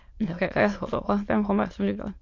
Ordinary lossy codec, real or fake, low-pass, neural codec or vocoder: MP3, 48 kbps; fake; 7.2 kHz; autoencoder, 22.05 kHz, a latent of 192 numbers a frame, VITS, trained on many speakers